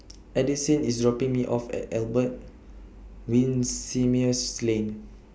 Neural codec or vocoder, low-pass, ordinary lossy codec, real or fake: none; none; none; real